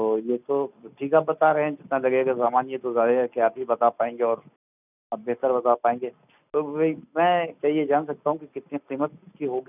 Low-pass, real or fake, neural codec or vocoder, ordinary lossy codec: 3.6 kHz; real; none; none